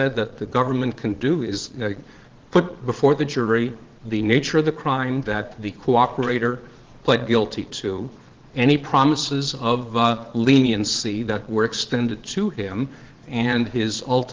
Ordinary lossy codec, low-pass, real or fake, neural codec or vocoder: Opus, 16 kbps; 7.2 kHz; fake; vocoder, 22.05 kHz, 80 mel bands, Vocos